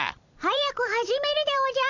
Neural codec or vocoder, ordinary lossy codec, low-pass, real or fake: vocoder, 44.1 kHz, 128 mel bands every 512 samples, BigVGAN v2; none; 7.2 kHz; fake